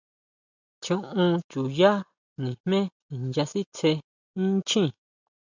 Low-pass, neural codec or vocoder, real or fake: 7.2 kHz; none; real